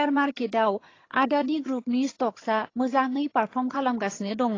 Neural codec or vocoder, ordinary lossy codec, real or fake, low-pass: vocoder, 22.05 kHz, 80 mel bands, HiFi-GAN; AAC, 32 kbps; fake; 7.2 kHz